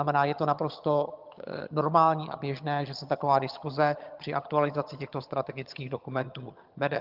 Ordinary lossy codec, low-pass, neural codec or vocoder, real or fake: Opus, 32 kbps; 5.4 kHz; vocoder, 22.05 kHz, 80 mel bands, HiFi-GAN; fake